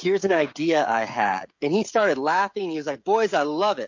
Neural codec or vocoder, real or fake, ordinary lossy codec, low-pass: codec, 16 kHz, 16 kbps, FreqCodec, smaller model; fake; MP3, 48 kbps; 7.2 kHz